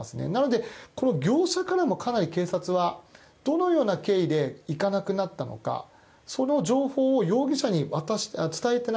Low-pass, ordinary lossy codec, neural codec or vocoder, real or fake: none; none; none; real